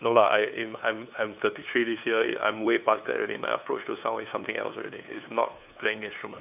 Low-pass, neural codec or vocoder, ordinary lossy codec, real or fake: 3.6 kHz; codec, 16 kHz, 2 kbps, FunCodec, trained on LibriTTS, 25 frames a second; none; fake